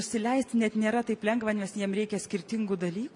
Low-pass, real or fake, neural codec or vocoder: 10.8 kHz; real; none